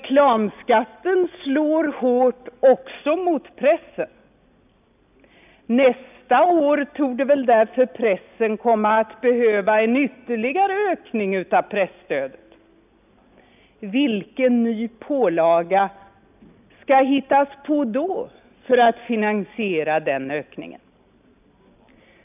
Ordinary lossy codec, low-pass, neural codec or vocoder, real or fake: none; 3.6 kHz; none; real